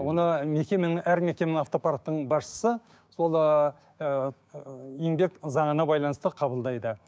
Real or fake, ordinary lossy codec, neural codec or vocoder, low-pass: fake; none; codec, 16 kHz, 6 kbps, DAC; none